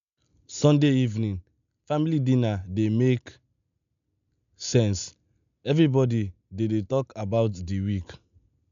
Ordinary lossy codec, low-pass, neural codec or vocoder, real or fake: none; 7.2 kHz; none; real